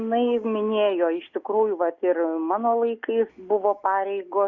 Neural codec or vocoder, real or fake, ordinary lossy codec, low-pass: none; real; Opus, 64 kbps; 7.2 kHz